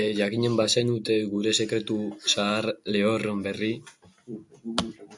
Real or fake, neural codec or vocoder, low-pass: real; none; 10.8 kHz